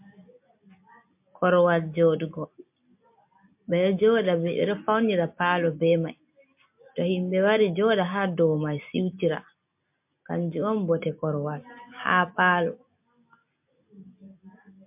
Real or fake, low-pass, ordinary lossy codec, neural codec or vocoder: real; 3.6 kHz; MP3, 32 kbps; none